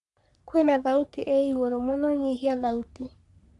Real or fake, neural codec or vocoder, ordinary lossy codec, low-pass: fake; codec, 44.1 kHz, 3.4 kbps, Pupu-Codec; none; 10.8 kHz